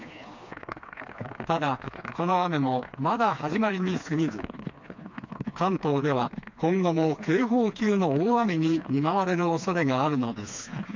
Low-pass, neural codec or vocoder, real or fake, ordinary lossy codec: 7.2 kHz; codec, 16 kHz, 2 kbps, FreqCodec, smaller model; fake; MP3, 64 kbps